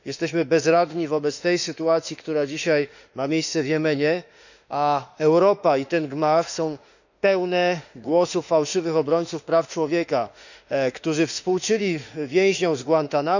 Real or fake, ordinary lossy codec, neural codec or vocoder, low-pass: fake; none; autoencoder, 48 kHz, 32 numbers a frame, DAC-VAE, trained on Japanese speech; 7.2 kHz